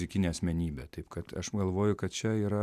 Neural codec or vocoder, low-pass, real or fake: none; 14.4 kHz; real